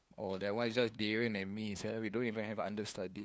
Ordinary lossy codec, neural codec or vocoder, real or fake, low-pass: none; codec, 16 kHz, 2 kbps, FunCodec, trained on LibriTTS, 25 frames a second; fake; none